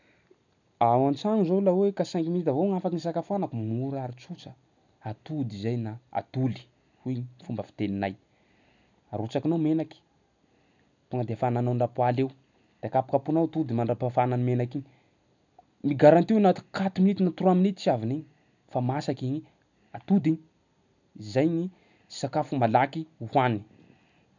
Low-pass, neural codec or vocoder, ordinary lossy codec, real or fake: 7.2 kHz; none; none; real